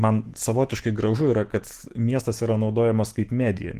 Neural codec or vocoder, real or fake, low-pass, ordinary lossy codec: autoencoder, 48 kHz, 128 numbers a frame, DAC-VAE, trained on Japanese speech; fake; 14.4 kHz; Opus, 16 kbps